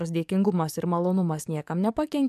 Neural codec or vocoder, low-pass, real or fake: codec, 44.1 kHz, 7.8 kbps, DAC; 14.4 kHz; fake